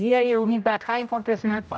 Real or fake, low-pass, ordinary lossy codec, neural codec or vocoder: fake; none; none; codec, 16 kHz, 0.5 kbps, X-Codec, HuBERT features, trained on general audio